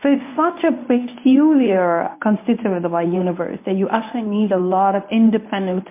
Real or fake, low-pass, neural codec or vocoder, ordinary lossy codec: fake; 3.6 kHz; codec, 24 kHz, 0.9 kbps, WavTokenizer, medium speech release version 1; MP3, 24 kbps